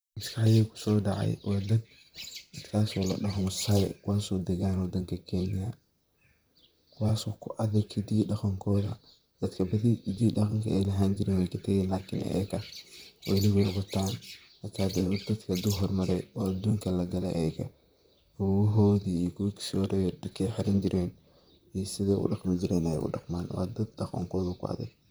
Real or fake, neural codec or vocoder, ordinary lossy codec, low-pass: fake; vocoder, 44.1 kHz, 128 mel bands, Pupu-Vocoder; none; none